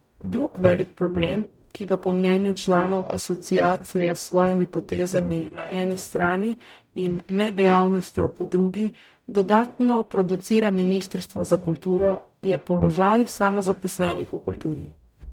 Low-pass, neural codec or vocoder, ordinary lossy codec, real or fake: 19.8 kHz; codec, 44.1 kHz, 0.9 kbps, DAC; MP3, 96 kbps; fake